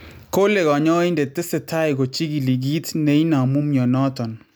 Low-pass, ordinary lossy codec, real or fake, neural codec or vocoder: none; none; real; none